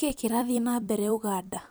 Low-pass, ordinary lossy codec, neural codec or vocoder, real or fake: none; none; vocoder, 44.1 kHz, 128 mel bands every 256 samples, BigVGAN v2; fake